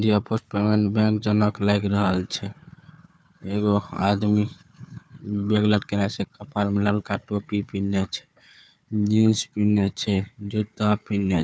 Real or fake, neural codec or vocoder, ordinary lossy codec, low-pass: fake; codec, 16 kHz, 4 kbps, FunCodec, trained on Chinese and English, 50 frames a second; none; none